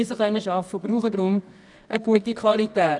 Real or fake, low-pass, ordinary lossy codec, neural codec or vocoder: fake; 10.8 kHz; none; codec, 24 kHz, 0.9 kbps, WavTokenizer, medium music audio release